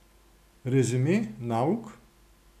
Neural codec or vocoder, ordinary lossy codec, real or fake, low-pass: none; none; real; 14.4 kHz